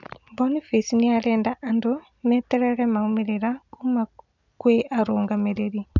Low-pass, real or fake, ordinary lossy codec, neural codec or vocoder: 7.2 kHz; real; none; none